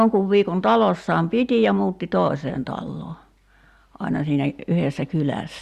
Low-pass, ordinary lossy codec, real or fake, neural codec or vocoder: 14.4 kHz; Opus, 64 kbps; real; none